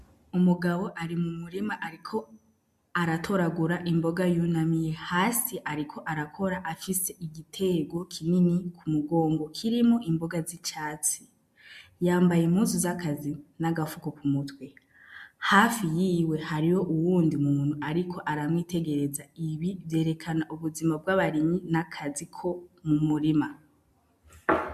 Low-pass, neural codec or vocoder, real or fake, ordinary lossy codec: 14.4 kHz; none; real; MP3, 96 kbps